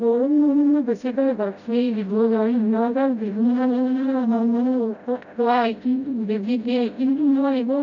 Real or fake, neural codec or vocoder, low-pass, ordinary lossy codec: fake; codec, 16 kHz, 0.5 kbps, FreqCodec, smaller model; 7.2 kHz; none